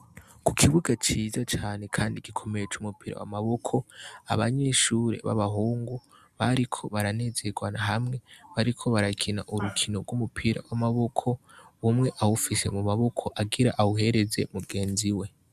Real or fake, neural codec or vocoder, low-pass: real; none; 14.4 kHz